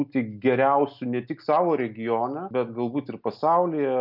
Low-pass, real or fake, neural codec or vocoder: 5.4 kHz; real; none